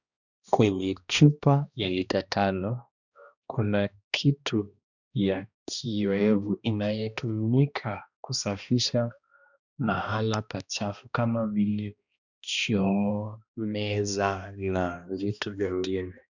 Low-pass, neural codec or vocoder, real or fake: 7.2 kHz; codec, 16 kHz, 1 kbps, X-Codec, HuBERT features, trained on balanced general audio; fake